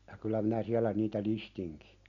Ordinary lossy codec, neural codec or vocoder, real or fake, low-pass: none; none; real; 7.2 kHz